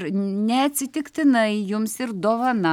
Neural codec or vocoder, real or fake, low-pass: none; real; 19.8 kHz